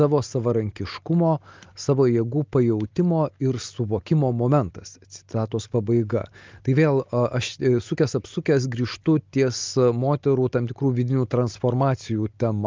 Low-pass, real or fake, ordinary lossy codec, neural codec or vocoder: 7.2 kHz; real; Opus, 32 kbps; none